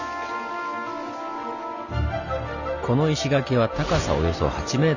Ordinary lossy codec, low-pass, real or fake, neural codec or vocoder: none; 7.2 kHz; real; none